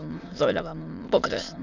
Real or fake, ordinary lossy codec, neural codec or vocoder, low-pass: fake; none; autoencoder, 22.05 kHz, a latent of 192 numbers a frame, VITS, trained on many speakers; 7.2 kHz